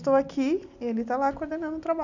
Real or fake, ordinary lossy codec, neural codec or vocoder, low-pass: real; none; none; 7.2 kHz